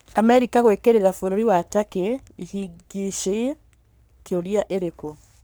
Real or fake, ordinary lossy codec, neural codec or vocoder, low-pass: fake; none; codec, 44.1 kHz, 3.4 kbps, Pupu-Codec; none